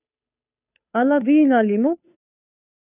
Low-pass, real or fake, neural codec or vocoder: 3.6 kHz; fake; codec, 16 kHz, 2 kbps, FunCodec, trained on Chinese and English, 25 frames a second